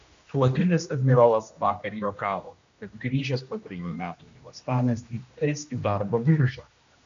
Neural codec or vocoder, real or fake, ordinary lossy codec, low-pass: codec, 16 kHz, 1 kbps, X-Codec, HuBERT features, trained on general audio; fake; MP3, 64 kbps; 7.2 kHz